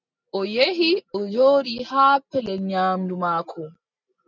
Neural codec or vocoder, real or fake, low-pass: none; real; 7.2 kHz